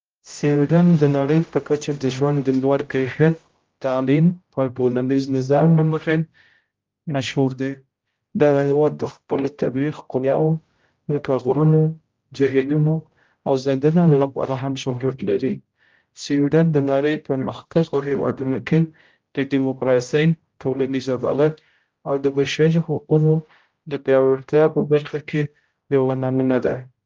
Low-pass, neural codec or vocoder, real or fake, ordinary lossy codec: 7.2 kHz; codec, 16 kHz, 0.5 kbps, X-Codec, HuBERT features, trained on general audio; fake; Opus, 32 kbps